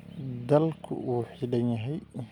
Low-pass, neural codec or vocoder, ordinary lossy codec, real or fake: 19.8 kHz; none; none; real